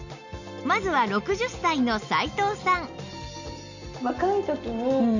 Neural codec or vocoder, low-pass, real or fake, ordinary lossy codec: none; 7.2 kHz; real; none